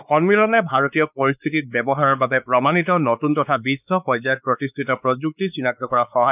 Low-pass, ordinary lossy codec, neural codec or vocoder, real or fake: 3.6 kHz; none; codec, 16 kHz, 4 kbps, X-Codec, WavLM features, trained on Multilingual LibriSpeech; fake